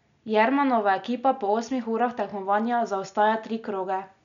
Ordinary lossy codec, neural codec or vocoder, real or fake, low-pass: none; none; real; 7.2 kHz